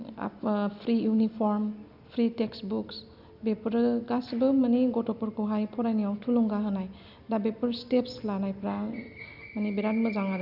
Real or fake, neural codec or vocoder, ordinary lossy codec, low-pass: real; none; none; 5.4 kHz